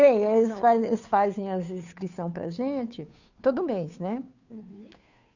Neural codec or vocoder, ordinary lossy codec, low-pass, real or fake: codec, 16 kHz, 2 kbps, FunCodec, trained on Chinese and English, 25 frames a second; none; 7.2 kHz; fake